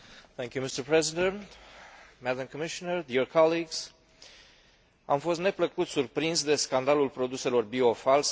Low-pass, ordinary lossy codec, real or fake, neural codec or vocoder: none; none; real; none